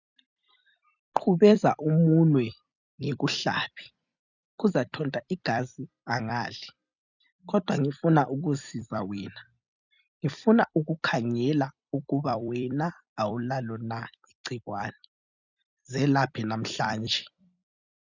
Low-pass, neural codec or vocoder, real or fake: 7.2 kHz; vocoder, 44.1 kHz, 128 mel bands every 256 samples, BigVGAN v2; fake